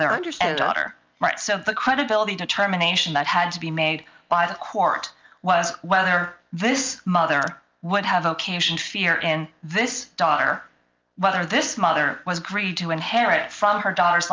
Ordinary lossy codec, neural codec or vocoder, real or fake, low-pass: Opus, 24 kbps; none; real; 7.2 kHz